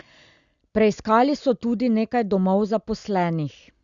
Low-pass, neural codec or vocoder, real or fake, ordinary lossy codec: 7.2 kHz; none; real; Opus, 64 kbps